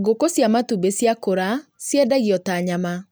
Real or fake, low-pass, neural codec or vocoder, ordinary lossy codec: real; none; none; none